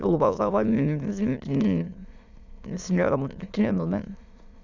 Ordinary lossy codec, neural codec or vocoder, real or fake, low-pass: Opus, 64 kbps; autoencoder, 22.05 kHz, a latent of 192 numbers a frame, VITS, trained on many speakers; fake; 7.2 kHz